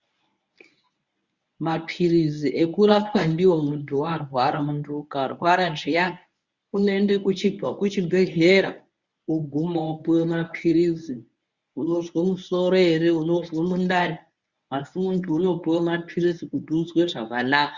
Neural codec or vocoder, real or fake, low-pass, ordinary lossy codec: codec, 24 kHz, 0.9 kbps, WavTokenizer, medium speech release version 1; fake; 7.2 kHz; Opus, 64 kbps